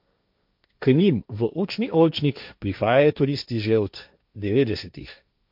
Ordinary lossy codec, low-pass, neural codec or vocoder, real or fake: MP3, 48 kbps; 5.4 kHz; codec, 16 kHz, 1.1 kbps, Voila-Tokenizer; fake